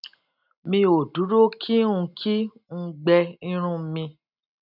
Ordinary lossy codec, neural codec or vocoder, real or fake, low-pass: none; none; real; 5.4 kHz